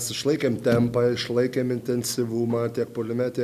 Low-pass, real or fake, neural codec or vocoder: 14.4 kHz; real; none